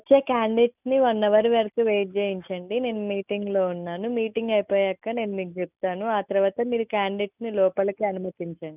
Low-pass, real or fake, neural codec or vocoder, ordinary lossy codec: 3.6 kHz; real; none; none